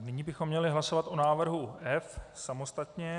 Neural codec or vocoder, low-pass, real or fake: none; 10.8 kHz; real